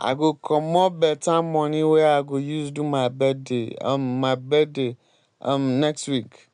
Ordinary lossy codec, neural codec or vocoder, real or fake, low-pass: none; none; real; 9.9 kHz